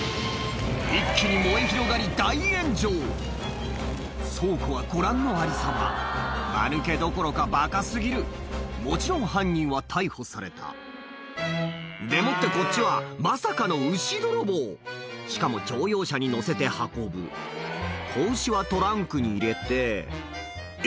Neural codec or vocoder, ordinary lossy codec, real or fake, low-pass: none; none; real; none